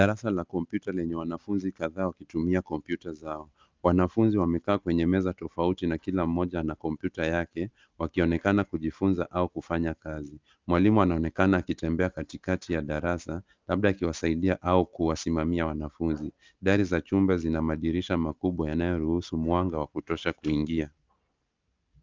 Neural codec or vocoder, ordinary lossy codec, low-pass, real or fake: none; Opus, 24 kbps; 7.2 kHz; real